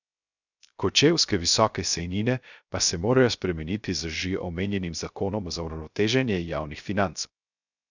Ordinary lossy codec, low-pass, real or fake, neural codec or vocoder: none; 7.2 kHz; fake; codec, 16 kHz, 0.3 kbps, FocalCodec